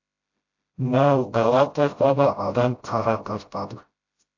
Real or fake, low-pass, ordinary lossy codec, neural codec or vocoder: fake; 7.2 kHz; AAC, 48 kbps; codec, 16 kHz, 0.5 kbps, FreqCodec, smaller model